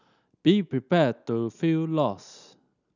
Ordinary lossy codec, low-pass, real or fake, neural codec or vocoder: none; 7.2 kHz; real; none